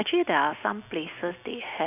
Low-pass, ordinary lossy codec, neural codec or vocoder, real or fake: 3.6 kHz; none; none; real